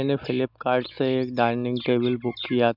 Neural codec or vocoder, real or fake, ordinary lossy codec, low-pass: none; real; none; 5.4 kHz